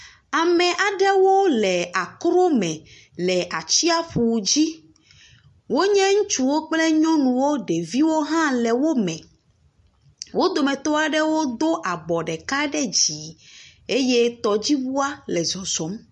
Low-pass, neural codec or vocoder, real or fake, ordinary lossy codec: 14.4 kHz; none; real; MP3, 48 kbps